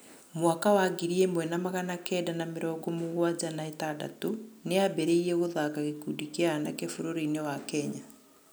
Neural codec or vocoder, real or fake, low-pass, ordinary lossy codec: none; real; none; none